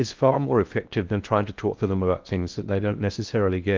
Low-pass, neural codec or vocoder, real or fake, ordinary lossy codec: 7.2 kHz; codec, 16 kHz in and 24 kHz out, 0.6 kbps, FocalCodec, streaming, 4096 codes; fake; Opus, 32 kbps